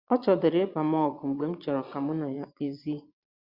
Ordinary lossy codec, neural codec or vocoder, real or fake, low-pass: Opus, 64 kbps; vocoder, 22.05 kHz, 80 mel bands, Vocos; fake; 5.4 kHz